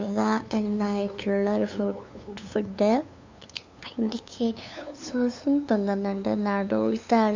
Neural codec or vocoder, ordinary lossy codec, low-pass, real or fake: codec, 16 kHz, 2 kbps, FunCodec, trained on LibriTTS, 25 frames a second; MP3, 64 kbps; 7.2 kHz; fake